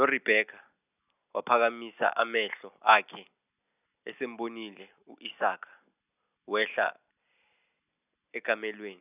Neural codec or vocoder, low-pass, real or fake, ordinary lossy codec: none; 3.6 kHz; real; none